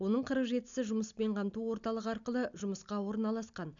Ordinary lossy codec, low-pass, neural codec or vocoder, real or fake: none; 7.2 kHz; none; real